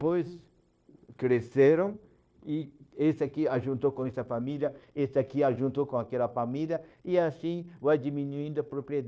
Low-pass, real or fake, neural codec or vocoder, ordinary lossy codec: none; fake; codec, 16 kHz, 0.9 kbps, LongCat-Audio-Codec; none